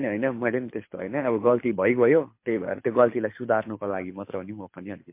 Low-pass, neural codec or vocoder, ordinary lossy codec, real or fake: 3.6 kHz; codec, 24 kHz, 6 kbps, HILCodec; MP3, 24 kbps; fake